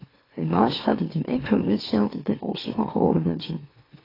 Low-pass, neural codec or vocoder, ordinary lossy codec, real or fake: 5.4 kHz; autoencoder, 44.1 kHz, a latent of 192 numbers a frame, MeloTTS; AAC, 24 kbps; fake